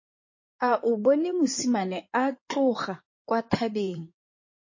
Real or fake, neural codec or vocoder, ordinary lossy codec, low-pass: fake; codec, 16 kHz in and 24 kHz out, 2.2 kbps, FireRedTTS-2 codec; MP3, 32 kbps; 7.2 kHz